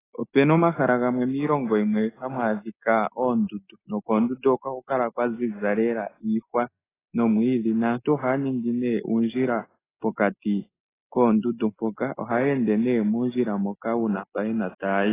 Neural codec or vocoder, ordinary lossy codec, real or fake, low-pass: none; AAC, 16 kbps; real; 3.6 kHz